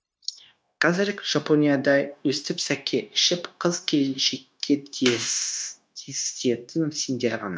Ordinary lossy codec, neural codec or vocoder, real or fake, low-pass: none; codec, 16 kHz, 0.9 kbps, LongCat-Audio-Codec; fake; none